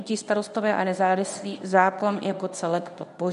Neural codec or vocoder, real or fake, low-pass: codec, 24 kHz, 0.9 kbps, WavTokenizer, medium speech release version 1; fake; 10.8 kHz